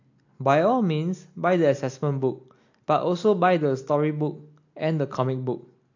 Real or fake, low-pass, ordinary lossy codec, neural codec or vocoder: real; 7.2 kHz; AAC, 48 kbps; none